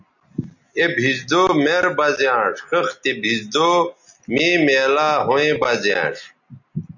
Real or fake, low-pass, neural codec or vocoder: real; 7.2 kHz; none